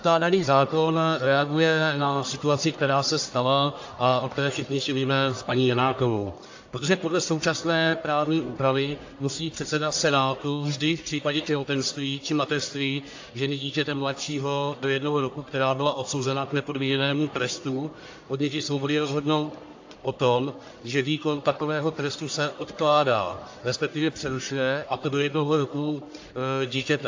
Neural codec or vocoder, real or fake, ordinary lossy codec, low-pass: codec, 44.1 kHz, 1.7 kbps, Pupu-Codec; fake; AAC, 48 kbps; 7.2 kHz